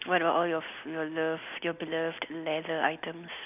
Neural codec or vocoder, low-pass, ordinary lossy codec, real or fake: none; 3.6 kHz; none; real